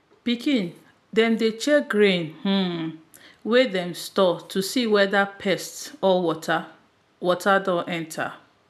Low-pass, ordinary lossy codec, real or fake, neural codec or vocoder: 14.4 kHz; none; real; none